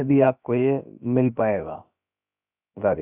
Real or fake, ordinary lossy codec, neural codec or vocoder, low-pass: fake; none; codec, 16 kHz, about 1 kbps, DyCAST, with the encoder's durations; 3.6 kHz